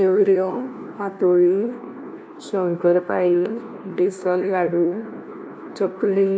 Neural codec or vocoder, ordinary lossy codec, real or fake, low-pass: codec, 16 kHz, 1 kbps, FunCodec, trained on LibriTTS, 50 frames a second; none; fake; none